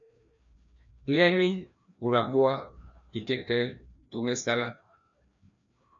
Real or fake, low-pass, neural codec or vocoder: fake; 7.2 kHz; codec, 16 kHz, 1 kbps, FreqCodec, larger model